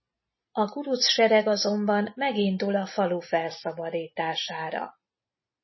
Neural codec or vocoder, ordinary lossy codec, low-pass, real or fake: none; MP3, 24 kbps; 7.2 kHz; real